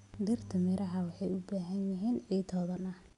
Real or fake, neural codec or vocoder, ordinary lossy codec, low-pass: real; none; none; 10.8 kHz